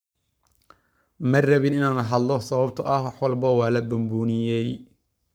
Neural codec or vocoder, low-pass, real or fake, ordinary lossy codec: codec, 44.1 kHz, 7.8 kbps, Pupu-Codec; none; fake; none